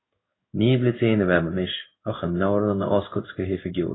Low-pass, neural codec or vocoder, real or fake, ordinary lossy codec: 7.2 kHz; codec, 16 kHz in and 24 kHz out, 1 kbps, XY-Tokenizer; fake; AAC, 16 kbps